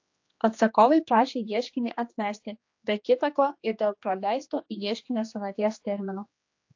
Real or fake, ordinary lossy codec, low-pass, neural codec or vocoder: fake; AAC, 48 kbps; 7.2 kHz; codec, 16 kHz, 2 kbps, X-Codec, HuBERT features, trained on general audio